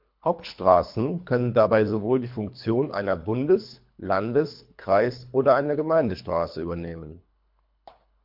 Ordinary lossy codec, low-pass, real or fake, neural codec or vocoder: MP3, 48 kbps; 5.4 kHz; fake; codec, 24 kHz, 3 kbps, HILCodec